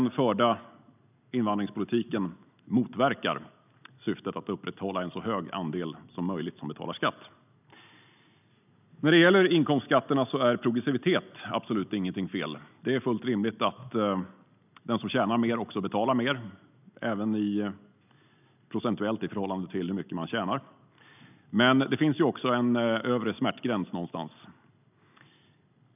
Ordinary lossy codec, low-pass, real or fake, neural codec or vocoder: none; 3.6 kHz; real; none